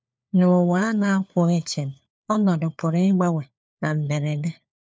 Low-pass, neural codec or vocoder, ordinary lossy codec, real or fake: none; codec, 16 kHz, 4 kbps, FunCodec, trained on LibriTTS, 50 frames a second; none; fake